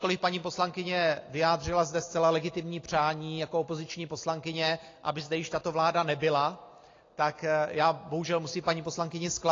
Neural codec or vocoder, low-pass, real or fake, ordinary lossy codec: none; 7.2 kHz; real; AAC, 32 kbps